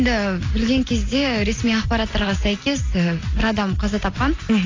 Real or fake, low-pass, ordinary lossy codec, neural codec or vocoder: real; 7.2 kHz; AAC, 32 kbps; none